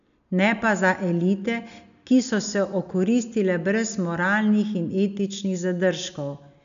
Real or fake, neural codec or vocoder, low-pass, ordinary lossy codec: real; none; 7.2 kHz; none